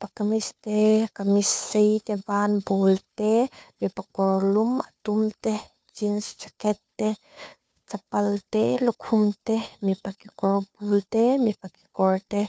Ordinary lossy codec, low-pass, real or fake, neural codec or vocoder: none; none; fake; codec, 16 kHz, 2 kbps, FunCodec, trained on Chinese and English, 25 frames a second